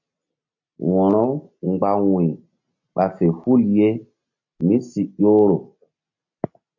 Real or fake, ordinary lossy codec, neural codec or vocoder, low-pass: real; AAC, 48 kbps; none; 7.2 kHz